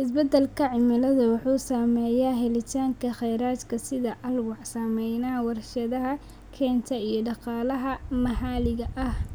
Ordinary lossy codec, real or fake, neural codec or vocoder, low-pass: none; real; none; none